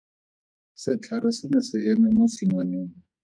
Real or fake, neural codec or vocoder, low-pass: fake; codec, 44.1 kHz, 2.6 kbps, SNAC; 9.9 kHz